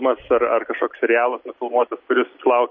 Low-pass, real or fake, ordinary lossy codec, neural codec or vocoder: 7.2 kHz; real; MP3, 32 kbps; none